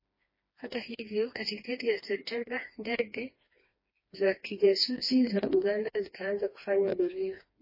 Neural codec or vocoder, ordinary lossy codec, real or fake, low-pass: codec, 16 kHz, 2 kbps, FreqCodec, smaller model; MP3, 24 kbps; fake; 5.4 kHz